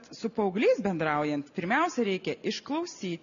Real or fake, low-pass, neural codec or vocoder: real; 7.2 kHz; none